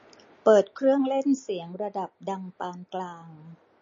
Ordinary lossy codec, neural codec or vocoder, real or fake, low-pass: MP3, 32 kbps; none; real; 7.2 kHz